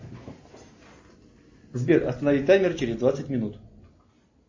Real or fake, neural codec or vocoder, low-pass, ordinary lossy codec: real; none; 7.2 kHz; MP3, 32 kbps